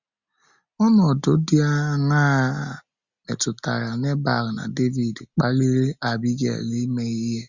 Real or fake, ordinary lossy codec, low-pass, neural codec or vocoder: real; Opus, 64 kbps; 7.2 kHz; none